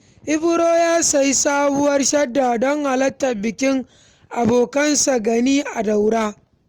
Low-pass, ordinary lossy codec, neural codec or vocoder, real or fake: 19.8 kHz; Opus, 24 kbps; none; real